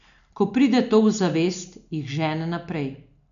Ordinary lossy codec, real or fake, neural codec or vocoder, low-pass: none; real; none; 7.2 kHz